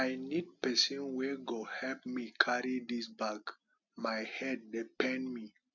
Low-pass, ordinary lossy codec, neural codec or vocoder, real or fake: 7.2 kHz; none; none; real